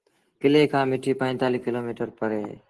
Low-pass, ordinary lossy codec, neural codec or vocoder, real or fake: 10.8 kHz; Opus, 16 kbps; none; real